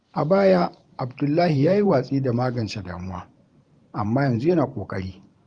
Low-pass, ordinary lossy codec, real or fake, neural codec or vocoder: 9.9 kHz; Opus, 32 kbps; fake; vocoder, 44.1 kHz, 128 mel bands every 512 samples, BigVGAN v2